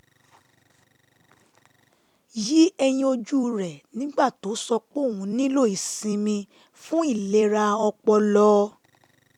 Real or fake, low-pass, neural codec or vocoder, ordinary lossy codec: fake; 19.8 kHz; vocoder, 44.1 kHz, 128 mel bands every 256 samples, BigVGAN v2; none